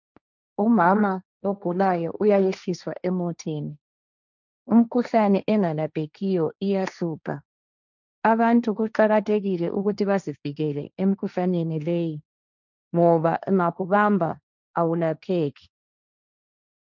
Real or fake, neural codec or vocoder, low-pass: fake; codec, 16 kHz, 1.1 kbps, Voila-Tokenizer; 7.2 kHz